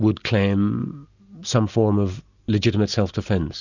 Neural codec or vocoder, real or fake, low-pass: none; real; 7.2 kHz